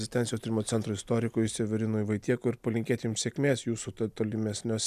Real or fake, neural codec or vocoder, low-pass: real; none; 14.4 kHz